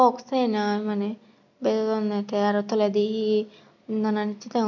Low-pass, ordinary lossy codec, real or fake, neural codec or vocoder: 7.2 kHz; none; real; none